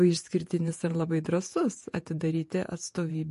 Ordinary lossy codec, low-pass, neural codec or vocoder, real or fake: MP3, 48 kbps; 14.4 kHz; vocoder, 48 kHz, 128 mel bands, Vocos; fake